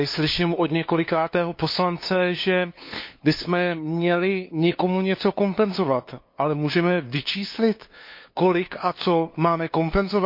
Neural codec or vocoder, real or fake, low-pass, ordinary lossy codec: codec, 16 kHz, 2 kbps, FunCodec, trained on LibriTTS, 25 frames a second; fake; 5.4 kHz; MP3, 32 kbps